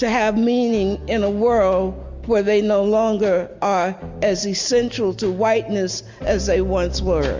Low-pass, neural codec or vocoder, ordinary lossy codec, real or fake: 7.2 kHz; none; MP3, 64 kbps; real